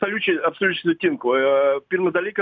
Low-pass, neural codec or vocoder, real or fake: 7.2 kHz; none; real